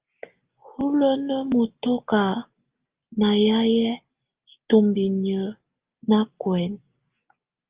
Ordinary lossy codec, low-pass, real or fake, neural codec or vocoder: Opus, 32 kbps; 3.6 kHz; real; none